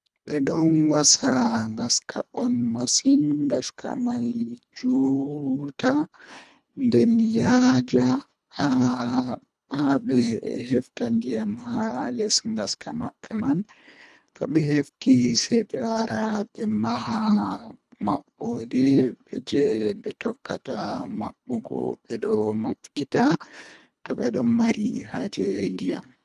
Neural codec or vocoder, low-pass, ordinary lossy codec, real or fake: codec, 24 kHz, 1.5 kbps, HILCodec; none; none; fake